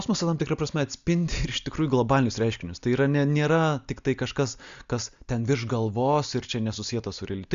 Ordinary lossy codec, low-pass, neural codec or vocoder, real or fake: Opus, 64 kbps; 7.2 kHz; none; real